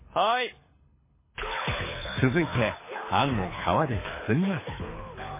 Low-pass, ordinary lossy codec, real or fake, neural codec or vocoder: 3.6 kHz; MP3, 16 kbps; fake; codec, 24 kHz, 6 kbps, HILCodec